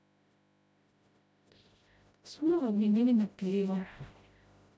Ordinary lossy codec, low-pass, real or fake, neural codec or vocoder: none; none; fake; codec, 16 kHz, 0.5 kbps, FreqCodec, smaller model